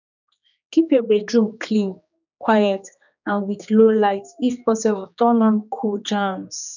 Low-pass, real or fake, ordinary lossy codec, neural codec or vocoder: 7.2 kHz; fake; none; codec, 16 kHz, 2 kbps, X-Codec, HuBERT features, trained on general audio